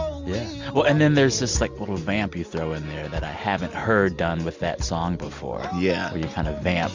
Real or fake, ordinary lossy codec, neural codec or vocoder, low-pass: real; MP3, 64 kbps; none; 7.2 kHz